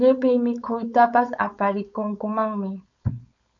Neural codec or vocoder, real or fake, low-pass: codec, 16 kHz, 4.8 kbps, FACodec; fake; 7.2 kHz